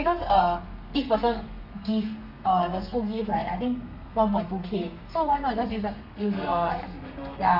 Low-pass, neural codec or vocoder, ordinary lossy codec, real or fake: 5.4 kHz; codec, 32 kHz, 1.9 kbps, SNAC; none; fake